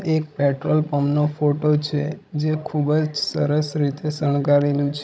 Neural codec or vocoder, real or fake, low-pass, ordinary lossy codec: codec, 16 kHz, 16 kbps, FreqCodec, larger model; fake; none; none